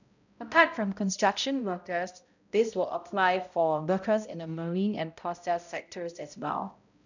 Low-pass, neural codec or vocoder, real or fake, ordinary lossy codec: 7.2 kHz; codec, 16 kHz, 0.5 kbps, X-Codec, HuBERT features, trained on balanced general audio; fake; none